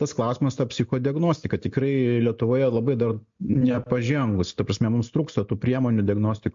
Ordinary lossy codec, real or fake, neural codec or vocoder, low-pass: MP3, 48 kbps; real; none; 7.2 kHz